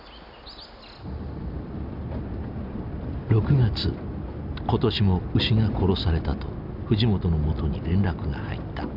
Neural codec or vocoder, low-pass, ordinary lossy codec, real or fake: none; 5.4 kHz; none; real